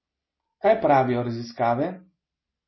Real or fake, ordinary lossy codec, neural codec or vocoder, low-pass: real; MP3, 24 kbps; none; 7.2 kHz